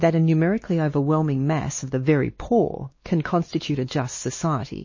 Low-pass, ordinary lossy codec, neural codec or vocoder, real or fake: 7.2 kHz; MP3, 32 kbps; codec, 16 kHz, 2 kbps, X-Codec, WavLM features, trained on Multilingual LibriSpeech; fake